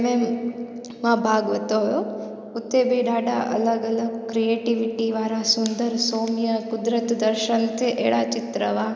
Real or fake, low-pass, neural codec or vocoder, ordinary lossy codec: real; none; none; none